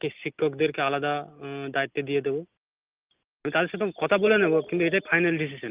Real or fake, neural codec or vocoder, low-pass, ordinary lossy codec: real; none; 3.6 kHz; Opus, 24 kbps